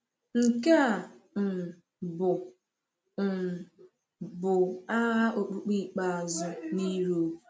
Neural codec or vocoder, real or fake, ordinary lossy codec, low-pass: none; real; none; none